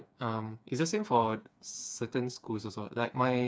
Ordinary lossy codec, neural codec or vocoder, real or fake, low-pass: none; codec, 16 kHz, 4 kbps, FreqCodec, smaller model; fake; none